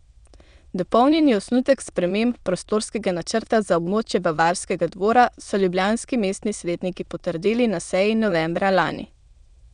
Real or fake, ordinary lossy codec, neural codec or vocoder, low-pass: fake; none; autoencoder, 22.05 kHz, a latent of 192 numbers a frame, VITS, trained on many speakers; 9.9 kHz